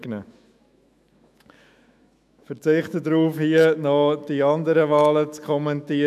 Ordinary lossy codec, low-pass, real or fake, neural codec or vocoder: none; 14.4 kHz; fake; autoencoder, 48 kHz, 128 numbers a frame, DAC-VAE, trained on Japanese speech